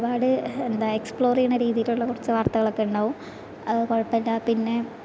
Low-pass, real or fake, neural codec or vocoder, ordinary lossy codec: none; real; none; none